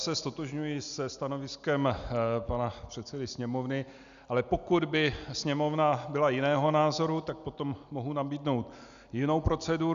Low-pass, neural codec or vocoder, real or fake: 7.2 kHz; none; real